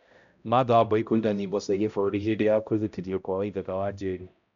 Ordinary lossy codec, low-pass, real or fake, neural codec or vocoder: none; 7.2 kHz; fake; codec, 16 kHz, 0.5 kbps, X-Codec, HuBERT features, trained on balanced general audio